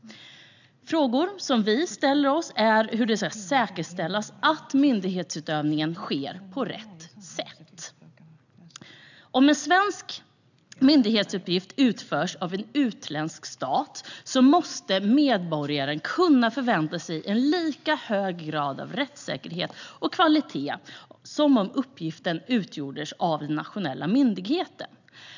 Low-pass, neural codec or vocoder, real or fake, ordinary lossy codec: 7.2 kHz; none; real; none